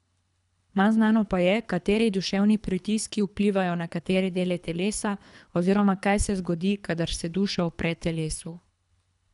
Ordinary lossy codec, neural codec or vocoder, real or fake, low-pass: none; codec, 24 kHz, 3 kbps, HILCodec; fake; 10.8 kHz